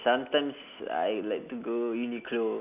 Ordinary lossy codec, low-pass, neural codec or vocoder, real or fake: none; 3.6 kHz; none; real